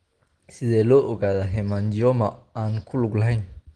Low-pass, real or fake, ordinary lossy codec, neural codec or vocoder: 9.9 kHz; real; Opus, 16 kbps; none